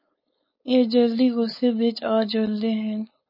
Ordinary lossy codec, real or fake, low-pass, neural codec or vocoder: MP3, 24 kbps; fake; 5.4 kHz; codec, 16 kHz, 4.8 kbps, FACodec